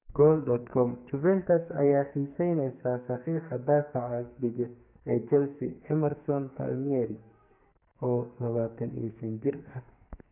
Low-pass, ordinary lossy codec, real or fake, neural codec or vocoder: 3.6 kHz; none; fake; codec, 44.1 kHz, 2.6 kbps, SNAC